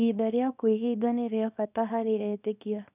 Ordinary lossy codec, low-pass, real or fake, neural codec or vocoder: none; 3.6 kHz; fake; codec, 24 kHz, 0.9 kbps, WavTokenizer, small release